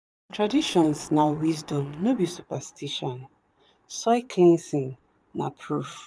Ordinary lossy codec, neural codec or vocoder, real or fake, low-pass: none; vocoder, 22.05 kHz, 80 mel bands, Vocos; fake; none